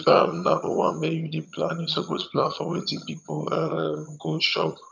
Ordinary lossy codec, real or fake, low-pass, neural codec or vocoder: none; fake; 7.2 kHz; vocoder, 22.05 kHz, 80 mel bands, HiFi-GAN